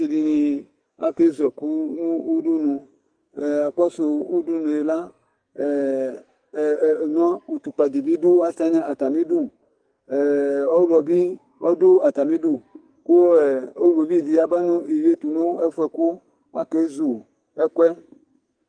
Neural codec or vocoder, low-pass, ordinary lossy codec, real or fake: codec, 44.1 kHz, 2.6 kbps, SNAC; 9.9 kHz; Opus, 32 kbps; fake